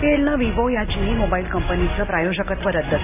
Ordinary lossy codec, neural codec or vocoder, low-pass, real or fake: AAC, 24 kbps; none; 3.6 kHz; real